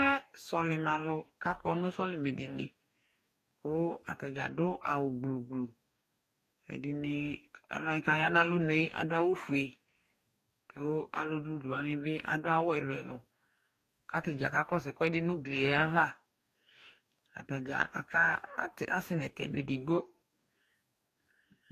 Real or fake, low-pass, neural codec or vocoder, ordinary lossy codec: fake; 14.4 kHz; codec, 44.1 kHz, 2.6 kbps, DAC; AAC, 64 kbps